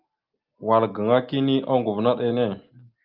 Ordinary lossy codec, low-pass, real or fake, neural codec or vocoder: Opus, 32 kbps; 5.4 kHz; real; none